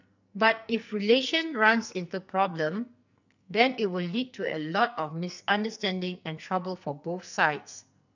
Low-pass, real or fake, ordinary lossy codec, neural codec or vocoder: 7.2 kHz; fake; none; codec, 44.1 kHz, 2.6 kbps, SNAC